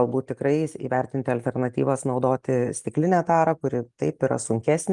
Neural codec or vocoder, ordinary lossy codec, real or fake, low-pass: none; Opus, 32 kbps; real; 10.8 kHz